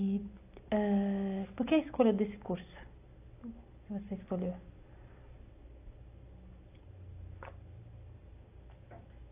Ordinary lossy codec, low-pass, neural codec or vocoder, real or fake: AAC, 24 kbps; 3.6 kHz; none; real